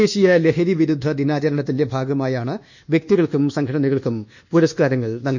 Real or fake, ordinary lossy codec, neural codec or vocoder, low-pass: fake; none; codec, 24 kHz, 1.2 kbps, DualCodec; 7.2 kHz